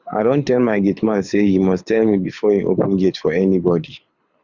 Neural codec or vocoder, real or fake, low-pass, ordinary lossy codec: codec, 24 kHz, 6 kbps, HILCodec; fake; 7.2 kHz; Opus, 64 kbps